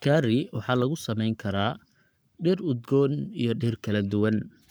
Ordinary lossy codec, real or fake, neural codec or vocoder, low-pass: none; fake; codec, 44.1 kHz, 7.8 kbps, Pupu-Codec; none